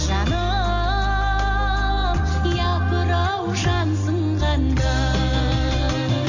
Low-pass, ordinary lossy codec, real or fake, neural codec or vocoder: 7.2 kHz; none; real; none